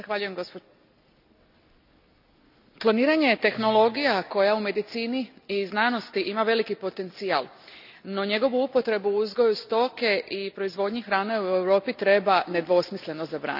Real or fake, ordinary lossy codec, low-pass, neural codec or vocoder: real; none; 5.4 kHz; none